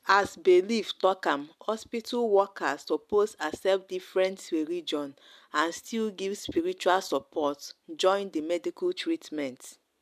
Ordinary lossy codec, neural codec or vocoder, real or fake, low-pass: MP3, 96 kbps; none; real; 14.4 kHz